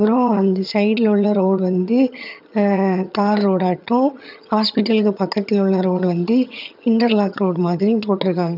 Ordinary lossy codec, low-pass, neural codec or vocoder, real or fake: none; 5.4 kHz; vocoder, 22.05 kHz, 80 mel bands, HiFi-GAN; fake